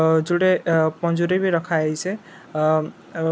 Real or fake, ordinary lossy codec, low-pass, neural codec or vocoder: real; none; none; none